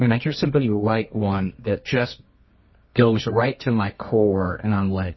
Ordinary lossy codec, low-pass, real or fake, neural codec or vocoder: MP3, 24 kbps; 7.2 kHz; fake; codec, 24 kHz, 0.9 kbps, WavTokenizer, medium music audio release